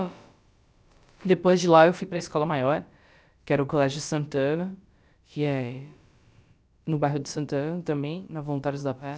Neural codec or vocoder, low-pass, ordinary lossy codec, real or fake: codec, 16 kHz, about 1 kbps, DyCAST, with the encoder's durations; none; none; fake